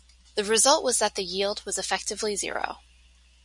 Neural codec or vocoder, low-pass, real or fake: none; 10.8 kHz; real